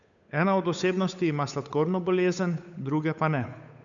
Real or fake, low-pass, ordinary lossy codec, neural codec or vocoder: fake; 7.2 kHz; none; codec, 16 kHz, 8 kbps, FunCodec, trained on Chinese and English, 25 frames a second